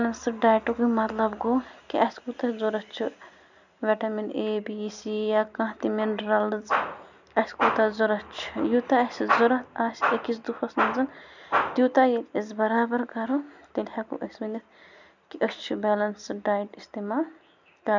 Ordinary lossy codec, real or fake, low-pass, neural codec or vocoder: none; real; 7.2 kHz; none